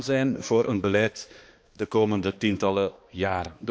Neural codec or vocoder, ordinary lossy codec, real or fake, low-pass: codec, 16 kHz, 2 kbps, X-Codec, HuBERT features, trained on balanced general audio; none; fake; none